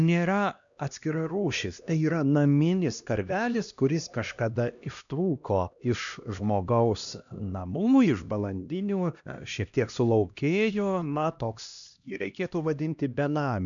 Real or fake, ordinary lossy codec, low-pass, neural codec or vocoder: fake; MP3, 96 kbps; 7.2 kHz; codec, 16 kHz, 1 kbps, X-Codec, HuBERT features, trained on LibriSpeech